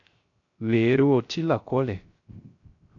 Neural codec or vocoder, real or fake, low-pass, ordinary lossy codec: codec, 16 kHz, 0.3 kbps, FocalCodec; fake; 7.2 kHz; MP3, 48 kbps